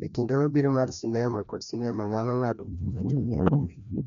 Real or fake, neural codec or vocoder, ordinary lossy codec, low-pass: fake; codec, 16 kHz, 1 kbps, FreqCodec, larger model; none; 7.2 kHz